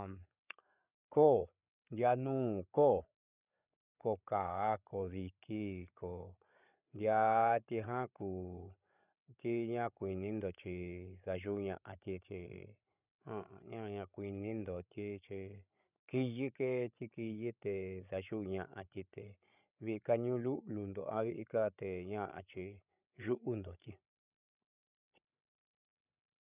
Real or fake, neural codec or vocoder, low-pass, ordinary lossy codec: fake; codec, 16 kHz, 8 kbps, FreqCodec, larger model; 3.6 kHz; none